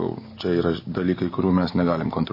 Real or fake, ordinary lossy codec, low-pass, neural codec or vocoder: real; MP3, 24 kbps; 5.4 kHz; none